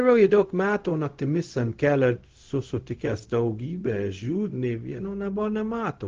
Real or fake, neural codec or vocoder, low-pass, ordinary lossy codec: fake; codec, 16 kHz, 0.4 kbps, LongCat-Audio-Codec; 7.2 kHz; Opus, 16 kbps